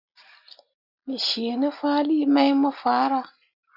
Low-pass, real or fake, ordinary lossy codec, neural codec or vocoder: 5.4 kHz; real; Opus, 64 kbps; none